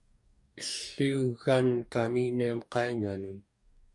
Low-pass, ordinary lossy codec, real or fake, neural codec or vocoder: 10.8 kHz; MP3, 64 kbps; fake; codec, 44.1 kHz, 2.6 kbps, DAC